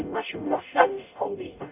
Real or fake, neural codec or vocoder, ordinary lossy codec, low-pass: fake; codec, 44.1 kHz, 0.9 kbps, DAC; none; 3.6 kHz